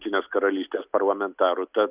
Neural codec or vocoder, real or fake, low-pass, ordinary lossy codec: none; real; 3.6 kHz; Opus, 64 kbps